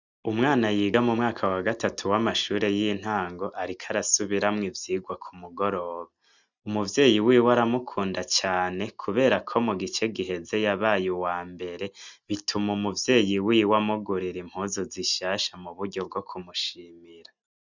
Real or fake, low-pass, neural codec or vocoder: real; 7.2 kHz; none